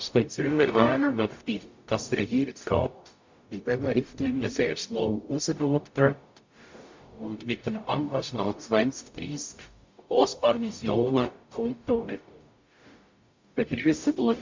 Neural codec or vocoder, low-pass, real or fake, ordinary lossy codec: codec, 44.1 kHz, 0.9 kbps, DAC; 7.2 kHz; fake; MP3, 48 kbps